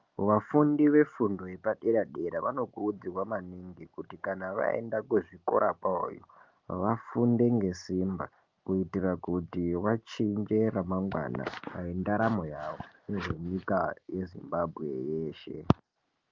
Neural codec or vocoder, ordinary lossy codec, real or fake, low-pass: none; Opus, 32 kbps; real; 7.2 kHz